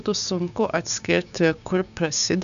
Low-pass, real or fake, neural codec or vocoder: 7.2 kHz; fake; codec, 16 kHz, 6 kbps, DAC